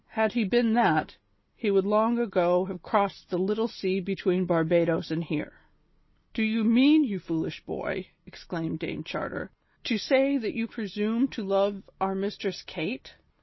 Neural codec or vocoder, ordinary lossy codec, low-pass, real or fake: none; MP3, 24 kbps; 7.2 kHz; real